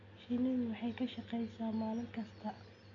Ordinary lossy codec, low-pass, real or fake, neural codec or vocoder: AAC, 64 kbps; 7.2 kHz; real; none